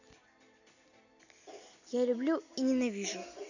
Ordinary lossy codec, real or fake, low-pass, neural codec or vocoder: none; real; 7.2 kHz; none